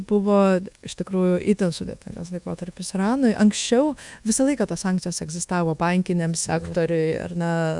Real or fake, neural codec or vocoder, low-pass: fake; codec, 24 kHz, 1.2 kbps, DualCodec; 10.8 kHz